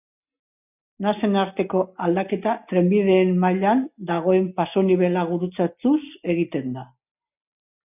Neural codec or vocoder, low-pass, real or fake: none; 3.6 kHz; real